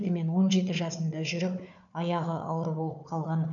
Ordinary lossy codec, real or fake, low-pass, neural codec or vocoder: none; fake; 7.2 kHz; codec, 16 kHz, 4 kbps, FunCodec, trained on Chinese and English, 50 frames a second